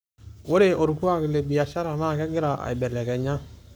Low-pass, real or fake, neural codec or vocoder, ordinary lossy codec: none; fake; codec, 44.1 kHz, 7.8 kbps, Pupu-Codec; none